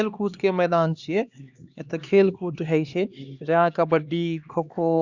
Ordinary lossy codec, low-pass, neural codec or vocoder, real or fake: Opus, 64 kbps; 7.2 kHz; codec, 16 kHz, 2 kbps, X-Codec, HuBERT features, trained on LibriSpeech; fake